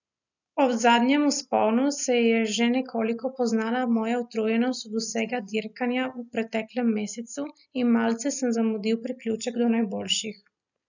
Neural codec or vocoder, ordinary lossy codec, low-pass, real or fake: none; none; 7.2 kHz; real